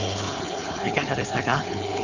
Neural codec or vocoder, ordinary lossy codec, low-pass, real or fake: codec, 16 kHz, 4.8 kbps, FACodec; none; 7.2 kHz; fake